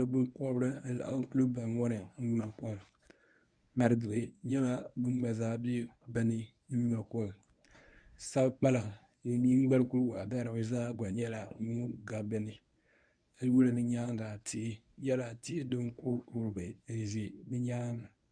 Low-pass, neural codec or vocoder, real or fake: 9.9 kHz; codec, 24 kHz, 0.9 kbps, WavTokenizer, medium speech release version 1; fake